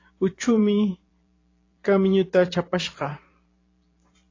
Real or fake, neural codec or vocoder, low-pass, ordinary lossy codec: fake; vocoder, 44.1 kHz, 128 mel bands every 512 samples, BigVGAN v2; 7.2 kHz; AAC, 32 kbps